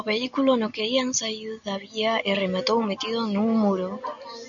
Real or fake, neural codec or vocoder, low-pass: real; none; 7.2 kHz